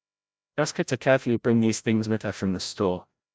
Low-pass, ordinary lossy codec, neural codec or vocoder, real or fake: none; none; codec, 16 kHz, 0.5 kbps, FreqCodec, larger model; fake